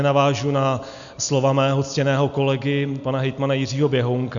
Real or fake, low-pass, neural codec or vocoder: real; 7.2 kHz; none